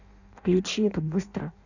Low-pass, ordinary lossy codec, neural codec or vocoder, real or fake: 7.2 kHz; none; codec, 16 kHz in and 24 kHz out, 0.6 kbps, FireRedTTS-2 codec; fake